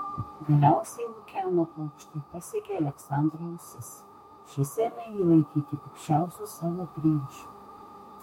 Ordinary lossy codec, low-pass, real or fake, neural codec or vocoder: MP3, 64 kbps; 19.8 kHz; fake; autoencoder, 48 kHz, 32 numbers a frame, DAC-VAE, trained on Japanese speech